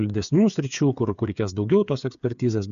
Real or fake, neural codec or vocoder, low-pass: fake; codec, 16 kHz, 8 kbps, FreqCodec, smaller model; 7.2 kHz